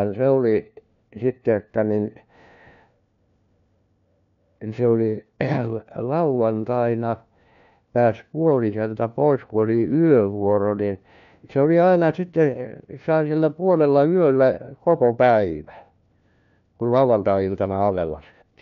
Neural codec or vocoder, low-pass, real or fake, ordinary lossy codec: codec, 16 kHz, 1 kbps, FunCodec, trained on LibriTTS, 50 frames a second; 7.2 kHz; fake; none